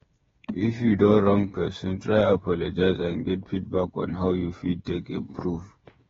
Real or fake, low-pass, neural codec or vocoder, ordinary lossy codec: fake; 7.2 kHz; codec, 16 kHz, 8 kbps, FreqCodec, smaller model; AAC, 24 kbps